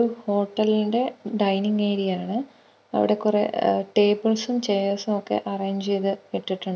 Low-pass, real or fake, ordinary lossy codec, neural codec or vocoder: none; real; none; none